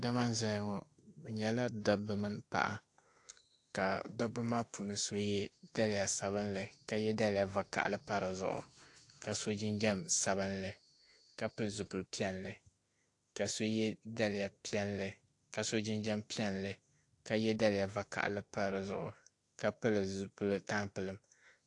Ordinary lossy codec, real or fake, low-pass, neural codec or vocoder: AAC, 48 kbps; fake; 10.8 kHz; autoencoder, 48 kHz, 32 numbers a frame, DAC-VAE, trained on Japanese speech